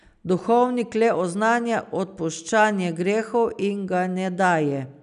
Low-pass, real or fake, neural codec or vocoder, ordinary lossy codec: 10.8 kHz; real; none; none